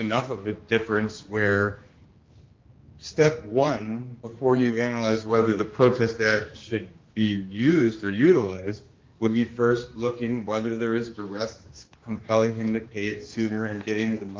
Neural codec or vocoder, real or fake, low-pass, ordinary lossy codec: codec, 16 kHz, 2 kbps, X-Codec, HuBERT features, trained on general audio; fake; 7.2 kHz; Opus, 32 kbps